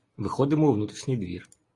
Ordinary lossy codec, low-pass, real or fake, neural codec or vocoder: AAC, 32 kbps; 10.8 kHz; real; none